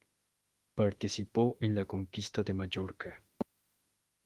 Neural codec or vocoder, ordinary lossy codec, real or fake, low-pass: autoencoder, 48 kHz, 32 numbers a frame, DAC-VAE, trained on Japanese speech; Opus, 24 kbps; fake; 14.4 kHz